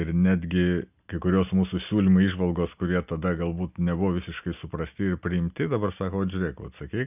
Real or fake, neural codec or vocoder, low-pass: real; none; 3.6 kHz